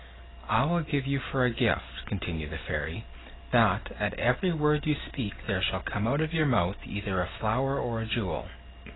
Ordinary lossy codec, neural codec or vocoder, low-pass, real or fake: AAC, 16 kbps; none; 7.2 kHz; real